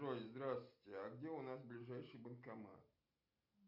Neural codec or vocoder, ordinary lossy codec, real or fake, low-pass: none; MP3, 48 kbps; real; 5.4 kHz